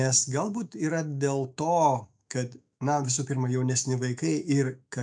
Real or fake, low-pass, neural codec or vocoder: fake; 9.9 kHz; autoencoder, 48 kHz, 128 numbers a frame, DAC-VAE, trained on Japanese speech